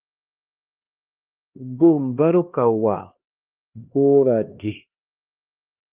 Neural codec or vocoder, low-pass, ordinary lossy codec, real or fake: codec, 16 kHz, 1 kbps, X-Codec, HuBERT features, trained on LibriSpeech; 3.6 kHz; Opus, 24 kbps; fake